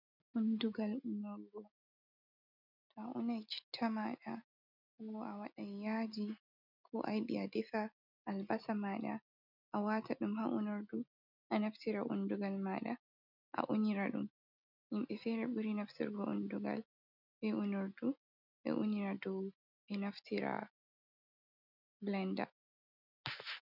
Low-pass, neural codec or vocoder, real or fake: 5.4 kHz; none; real